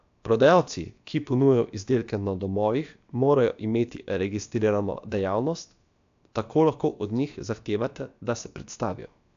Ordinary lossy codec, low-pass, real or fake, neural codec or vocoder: AAC, 64 kbps; 7.2 kHz; fake; codec, 16 kHz, about 1 kbps, DyCAST, with the encoder's durations